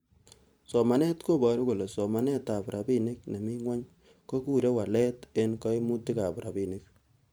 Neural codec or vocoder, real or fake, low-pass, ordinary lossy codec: vocoder, 44.1 kHz, 128 mel bands every 512 samples, BigVGAN v2; fake; none; none